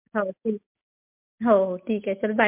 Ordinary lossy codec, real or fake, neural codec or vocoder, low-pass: MP3, 32 kbps; real; none; 3.6 kHz